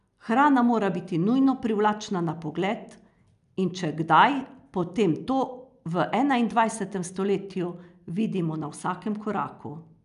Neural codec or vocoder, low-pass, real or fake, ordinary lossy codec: none; 10.8 kHz; real; none